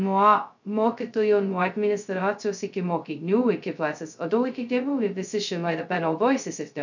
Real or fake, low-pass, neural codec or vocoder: fake; 7.2 kHz; codec, 16 kHz, 0.2 kbps, FocalCodec